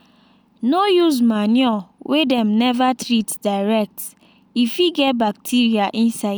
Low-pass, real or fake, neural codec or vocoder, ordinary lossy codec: none; real; none; none